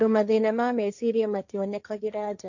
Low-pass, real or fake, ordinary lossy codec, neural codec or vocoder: 7.2 kHz; fake; none; codec, 16 kHz, 1.1 kbps, Voila-Tokenizer